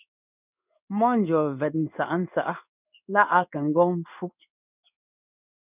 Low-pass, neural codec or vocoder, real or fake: 3.6 kHz; codec, 16 kHz in and 24 kHz out, 1 kbps, XY-Tokenizer; fake